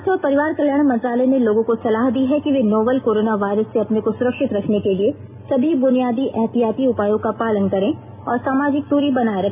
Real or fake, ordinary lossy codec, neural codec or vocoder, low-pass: real; MP3, 32 kbps; none; 3.6 kHz